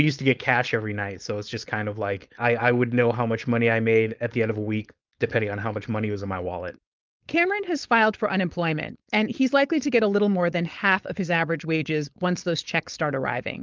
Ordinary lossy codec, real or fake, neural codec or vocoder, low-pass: Opus, 24 kbps; fake; codec, 16 kHz, 4.8 kbps, FACodec; 7.2 kHz